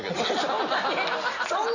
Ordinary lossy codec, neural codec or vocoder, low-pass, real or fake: none; none; 7.2 kHz; real